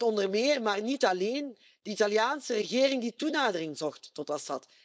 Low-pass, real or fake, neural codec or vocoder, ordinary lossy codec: none; fake; codec, 16 kHz, 4.8 kbps, FACodec; none